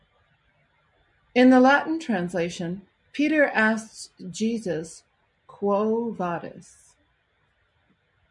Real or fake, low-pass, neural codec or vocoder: real; 10.8 kHz; none